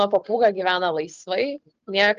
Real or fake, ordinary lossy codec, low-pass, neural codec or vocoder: real; Opus, 24 kbps; 7.2 kHz; none